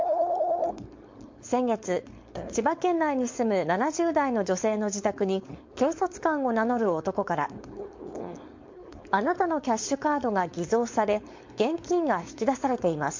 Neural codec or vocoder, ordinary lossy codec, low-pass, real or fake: codec, 16 kHz, 4.8 kbps, FACodec; MP3, 48 kbps; 7.2 kHz; fake